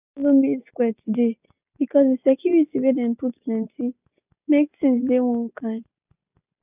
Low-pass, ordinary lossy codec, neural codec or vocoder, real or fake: 3.6 kHz; none; none; real